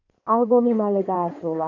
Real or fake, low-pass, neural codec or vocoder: fake; 7.2 kHz; codec, 16 kHz in and 24 kHz out, 2.2 kbps, FireRedTTS-2 codec